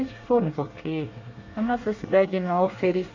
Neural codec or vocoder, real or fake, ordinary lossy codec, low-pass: codec, 24 kHz, 1 kbps, SNAC; fake; AAC, 48 kbps; 7.2 kHz